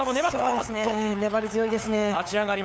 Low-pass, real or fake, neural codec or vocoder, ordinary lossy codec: none; fake; codec, 16 kHz, 8 kbps, FunCodec, trained on LibriTTS, 25 frames a second; none